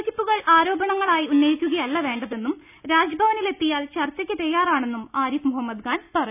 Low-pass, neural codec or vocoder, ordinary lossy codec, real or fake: 3.6 kHz; none; none; real